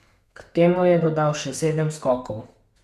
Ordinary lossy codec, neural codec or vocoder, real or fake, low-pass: none; codec, 32 kHz, 1.9 kbps, SNAC; fake; 14.4 kHz